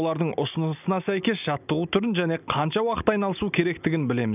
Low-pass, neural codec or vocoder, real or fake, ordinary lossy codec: 3.6 kHz; none; real; none